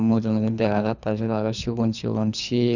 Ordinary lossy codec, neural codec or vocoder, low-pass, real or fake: none; codec, 24 kHz, 3 kbps, HILCodec; 7.2 kHz; fake